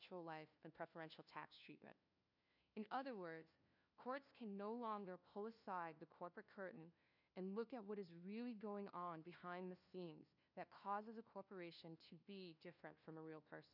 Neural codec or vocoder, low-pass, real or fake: codec, 16 kHz, 1 kbps, FunCodec, trained on LibriTTS, 50 frames a second; 5.4 kHz; fake